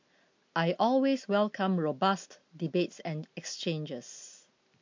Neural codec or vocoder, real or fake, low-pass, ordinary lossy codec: none; real; 7.2 kHz; MP3, 48 kbps